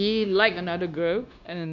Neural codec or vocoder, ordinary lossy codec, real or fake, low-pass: codec, 16 kHz, 0.9 kbps, LongCat-Audio-Codec; none; fake; 7.2 kHz